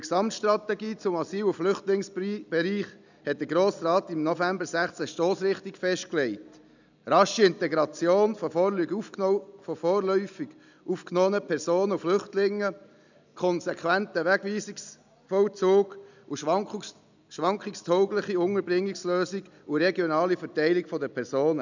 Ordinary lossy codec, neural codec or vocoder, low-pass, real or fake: none; none; 7.2 kHz; real